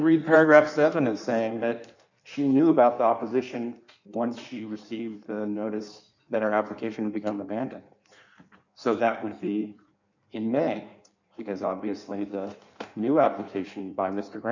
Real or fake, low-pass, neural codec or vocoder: fake; 7.2 kHz; codec, 16 kHz in and 24 kHz out, 1.1 kbps, FireRedTTS-2 codec